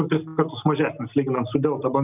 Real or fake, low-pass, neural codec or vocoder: real; 3.6 kHz; none